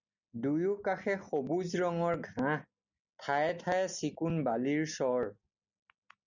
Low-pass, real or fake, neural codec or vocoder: 7.2 kHz; real; none